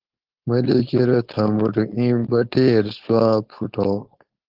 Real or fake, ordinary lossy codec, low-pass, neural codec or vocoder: fake; Opus, 16 kbps; 5.4 kHz; codec, 16 kHz, 4.8 kbps, FACodec